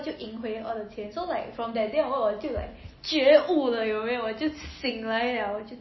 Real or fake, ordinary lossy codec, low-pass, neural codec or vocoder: real; MP3, 24 kbps; 7.2 kHz; none